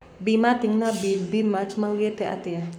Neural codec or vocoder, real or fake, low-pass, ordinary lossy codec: codec, 44.1 kHz, 7.8 kbps, Pupu-Codec; fake; 19.8 kHz; none